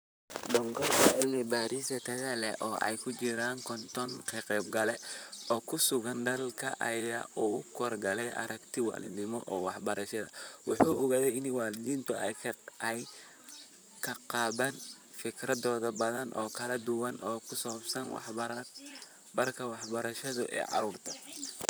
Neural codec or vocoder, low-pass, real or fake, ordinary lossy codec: vocoder, 44.1 kHz, 128 mel bands, Pupu-Vocoder; none; fake; none